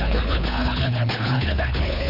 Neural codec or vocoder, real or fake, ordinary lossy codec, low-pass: codec, 24 kHz, 3 kbps, HILCodec; fake; none; 5.4 kHz